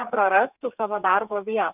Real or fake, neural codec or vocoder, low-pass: fake; codec, 32 kHz, 1.9 kbps, SNAC; 3.6 kHz